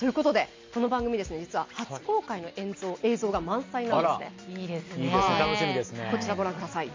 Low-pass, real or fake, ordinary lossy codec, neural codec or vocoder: 7.2 kHz; real; MP3, 48 kbps; none